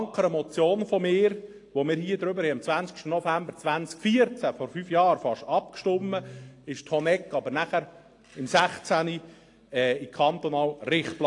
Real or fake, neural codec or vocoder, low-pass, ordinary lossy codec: real; none; 10.8 kHz; AAC, 48 kbps